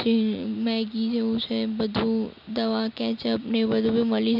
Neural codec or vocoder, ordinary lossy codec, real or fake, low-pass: none; AAC, 48 kbps; real; 5.4 kHz